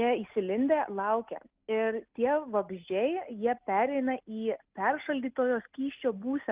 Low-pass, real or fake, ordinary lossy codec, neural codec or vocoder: 3.6 kHz; real; Opus, 32 kbps; none